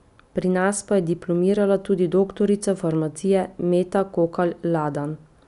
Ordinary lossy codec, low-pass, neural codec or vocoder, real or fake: none; 10.8 kHz; none; real